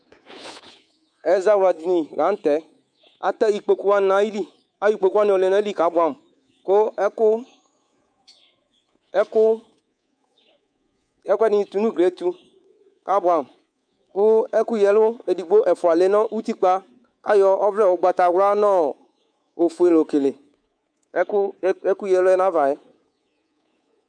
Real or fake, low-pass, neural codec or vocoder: fake; 9.9 kHz; codec, 24 kHz, 3.1 kbps, DualCodec